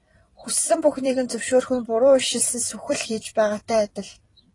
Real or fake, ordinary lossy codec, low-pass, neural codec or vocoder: fake; AAC, 32 kbps; 10.8 kHz; vocoder, 44.1 kHz, 128 mel bands every 256 samples, BigVGAN v2